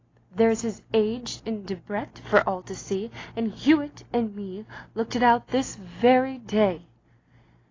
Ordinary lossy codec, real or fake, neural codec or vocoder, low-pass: AAC, 32 kbps; real; none; 7.2 kHz